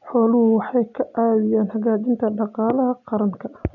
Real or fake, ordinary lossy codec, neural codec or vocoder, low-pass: real; none; none; 7.2 kHz